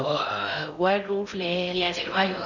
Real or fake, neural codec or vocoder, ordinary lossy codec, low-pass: fake; codec, 16 kHz in and 24 kHz out, 0.6 kbps, FocalCodec, streaming, 4096 codes; none; 7.2 kHz